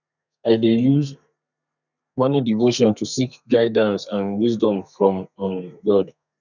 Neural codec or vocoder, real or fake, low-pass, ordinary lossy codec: codec, 32 kHz, 1.9 kbps, SNAC; fake; 7.2 kHz; none